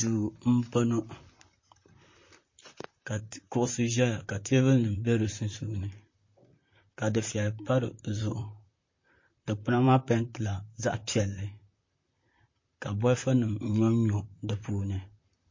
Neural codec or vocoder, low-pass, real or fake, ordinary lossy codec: vocoder, 44.1 kHz, 128 mel bands, Pupu-Vocoder; 7.2 kHz; fake; MP3, 32 kbps